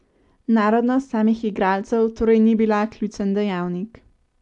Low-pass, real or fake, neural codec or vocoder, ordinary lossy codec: 10.8 kHz; real; none; AAC, 64 kbps